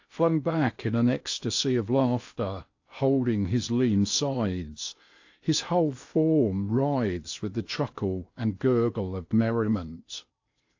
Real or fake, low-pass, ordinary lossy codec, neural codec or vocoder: fake; 7.2 kHz; AAC, 48 kbps; codec, 16 kHz in and 24 kHz out, 0.8 kbps, FocalCodec, streaming, 65536 codes